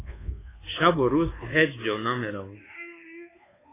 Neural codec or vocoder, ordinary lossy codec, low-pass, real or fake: codec, 24 kHz, 1.2 kbps, DualCodec; AAC, 16 kbps; 3.6 kHz; fake